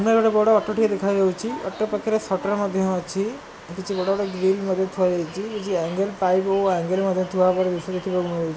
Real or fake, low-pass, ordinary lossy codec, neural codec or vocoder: real; none; none; none